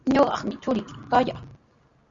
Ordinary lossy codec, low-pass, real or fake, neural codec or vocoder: Opus, 64 kbps; 7.2 kHz; real; none